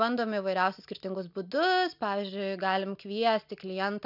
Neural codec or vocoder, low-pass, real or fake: none; 5.4 kHz; real